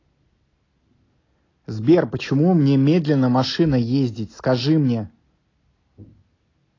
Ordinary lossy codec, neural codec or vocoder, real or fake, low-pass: AAC, 32 kbps; none; real; 7.2 kHz